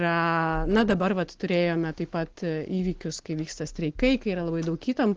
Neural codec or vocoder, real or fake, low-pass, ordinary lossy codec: none; real; 7.2 kHz; Opus, 16 kbps